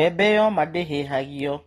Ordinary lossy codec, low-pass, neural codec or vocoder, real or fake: AAC, 32 kbps; 19.8 kHz; none; real